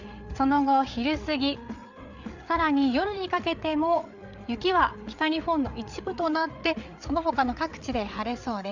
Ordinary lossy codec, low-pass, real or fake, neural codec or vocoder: Opus, 64 kbps; 7.2 kHz; fake; codec, 16 kHz, 8 kbps, FreqCodec, larger model